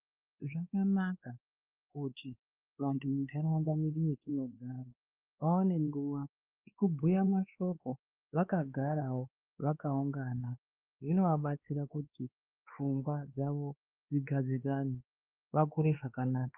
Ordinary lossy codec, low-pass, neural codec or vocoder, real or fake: Opus, 32 kbps; 3.6 kHz; codec, 16 kHz, 2 kbps, X-Codec, WavLM features, trained on Multilingual LibriSpeech; fake